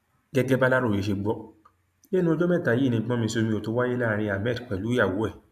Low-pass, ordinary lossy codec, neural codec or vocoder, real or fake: 14.4 kHz; AAC, 96 kbps; none; real